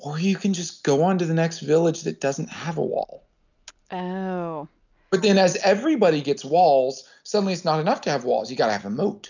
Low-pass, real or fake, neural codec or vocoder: 7.2 kHz; real; none